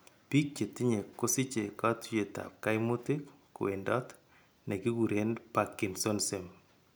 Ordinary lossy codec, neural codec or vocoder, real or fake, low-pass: none; none; real; none